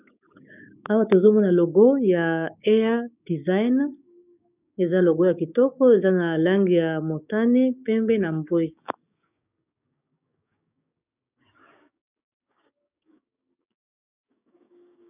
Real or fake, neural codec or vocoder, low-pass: fake; codec, 16 kHz, 6 kbps, DAC; 3.6 kHz